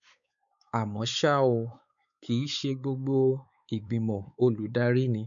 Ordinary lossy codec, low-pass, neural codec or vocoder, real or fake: none; 7.2 kHz; codec, 16 kHz, 4 kbps, X-Codec, WavLM features, trained on Multilingual LibriSpeech; fake